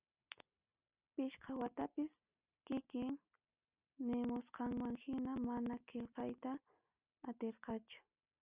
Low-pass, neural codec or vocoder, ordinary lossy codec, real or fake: 3.6 kHz; none; Opus, 64 kbps; real